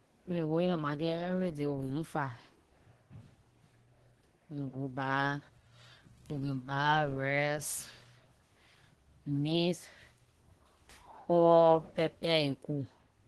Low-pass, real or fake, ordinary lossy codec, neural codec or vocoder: 10.8 kHz; fake; Opus, 16 kbps; codec, 24 kHz, 1 kbps, SNAC